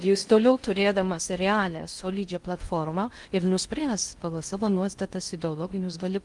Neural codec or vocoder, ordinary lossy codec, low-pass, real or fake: codec, 16 kHz in and 24 kHz out, 0.6 kbps, FocalCodec, streaming, 2048 codes; Opus, 32 kbps; 10.8 kHz; fake